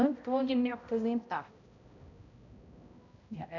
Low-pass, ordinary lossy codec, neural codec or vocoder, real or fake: 7.2 kHz; none; codec, 16 kHz, 0.5 kbps, X-Codec, HuBERT features, trained on balanced general audio; fake